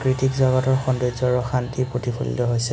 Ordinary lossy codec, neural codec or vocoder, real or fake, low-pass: none; none; real; none